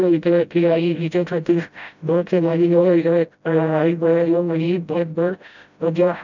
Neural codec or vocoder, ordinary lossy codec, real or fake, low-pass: codec, 16 kHz, 0.5 kbps, FreqCodec, smaller model; none; fake; 7.2 kHz